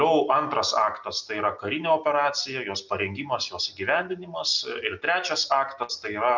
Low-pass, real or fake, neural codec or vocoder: 7.2 kHz; real; none